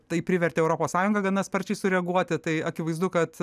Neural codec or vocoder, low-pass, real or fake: none; 14.4 kHz; real